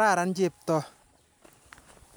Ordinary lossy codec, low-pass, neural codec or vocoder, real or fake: none; none; none; real